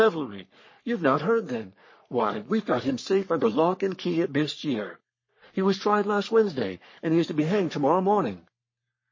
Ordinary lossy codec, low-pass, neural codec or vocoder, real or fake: MP3, 32 kbps; 7.2 kHz; codec, 44.1 kHz, 3.4 kbps, Pupu-Codec; fake